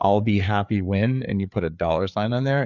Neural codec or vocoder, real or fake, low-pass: codec, 16 kHz, 4 kbps, FreqCodec, larger model; fake; 7.2 kHz